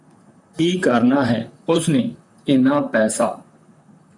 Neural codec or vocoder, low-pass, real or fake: vocoder, 44.1 kHz, 128 mel bands, Pupu-Vocoder; 10.8 kHz; fake